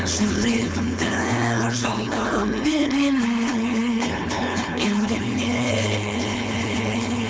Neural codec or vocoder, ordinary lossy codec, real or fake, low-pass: codec, 16 kHz, 4.8 kbps, FACodec; none; fake; none